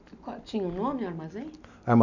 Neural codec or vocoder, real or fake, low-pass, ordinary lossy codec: none; real; 7.2 kHz; none